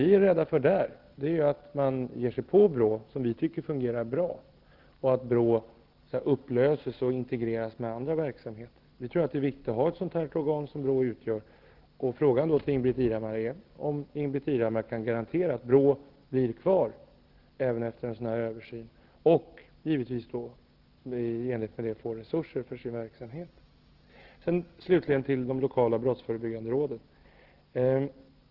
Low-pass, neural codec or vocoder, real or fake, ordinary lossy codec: 5.4 kHz; none; real; Opus, 16 kbps